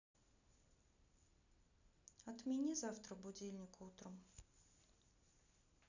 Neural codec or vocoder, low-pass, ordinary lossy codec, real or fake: none; 7.2 kHz; none; real